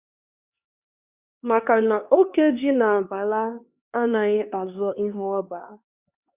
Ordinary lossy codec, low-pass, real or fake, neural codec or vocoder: Opus, 64 kbps; 3.6 kHz; fake; codec, 16 kHz, 2 kbps, X-Codec, HuBERT features, trained on LibriSpeech